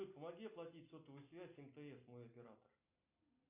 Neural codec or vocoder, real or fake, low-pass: none; real; 3.6 kHz